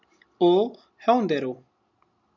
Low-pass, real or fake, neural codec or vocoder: 7.2 kHz; real; none